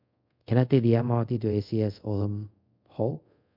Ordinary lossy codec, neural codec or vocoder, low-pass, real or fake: MP3, 48 kbps; codec, 24 kHz, 0.5 kbps, DualCodec; 5.4 kHz; fake